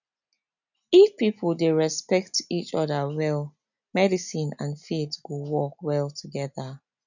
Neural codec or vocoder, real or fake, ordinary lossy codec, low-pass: none; real; none; 7.2 kHz